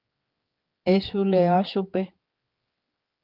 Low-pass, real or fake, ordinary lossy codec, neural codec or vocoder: 5.4 kHz; fake; Opus, 32 kbps; codec, 16 kHz, 4 kbps, X-Codec, HuBERT features, trained on general audio